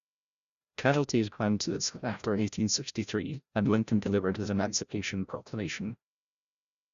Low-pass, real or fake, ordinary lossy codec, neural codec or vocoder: 7.2 kHz; fake; Opus, 64 kbps; codec, 16 kHz, 0.5 kbps, FreqCodec, larger model